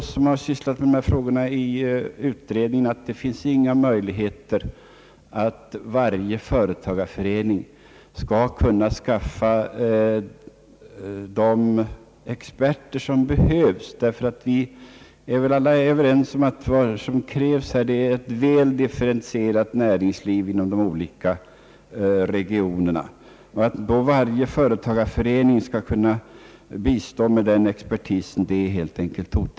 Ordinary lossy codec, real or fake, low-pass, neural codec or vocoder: none; real; none; none